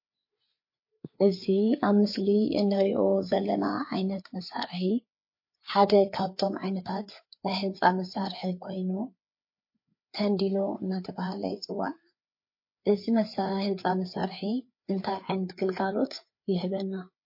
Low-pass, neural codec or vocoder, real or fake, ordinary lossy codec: 5.4 kHz; codec, 16 kHz, 4 kbps, FreqCodec, larger model; fake; MP3, 32 kbps